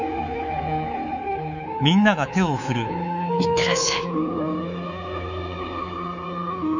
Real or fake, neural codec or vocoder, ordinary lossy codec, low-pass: fake; codec, 24 kHz, 3.1 kbps, DualCodec; none; 7.2 kHz